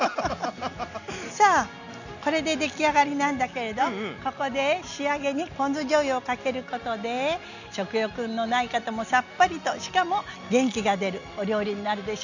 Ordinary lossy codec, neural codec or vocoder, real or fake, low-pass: none; none; real; 7.2 kHz